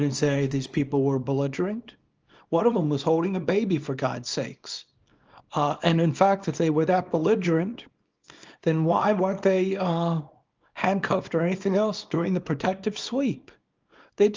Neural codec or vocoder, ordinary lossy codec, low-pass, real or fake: codec, 24 kHz, 0.9 kbps, WavTokenizer, small release; Opus, 24 kbps; 7.2 kHz; fake